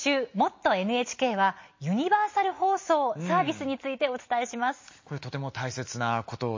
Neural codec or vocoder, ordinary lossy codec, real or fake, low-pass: none; MP3, 32 kbps; real; 7.2 kHz